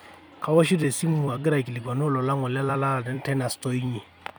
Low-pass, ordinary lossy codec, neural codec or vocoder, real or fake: none; none; vocoder, 44.1 kHz, 128 mel bands every 256 samples, BigVGAN v2; fake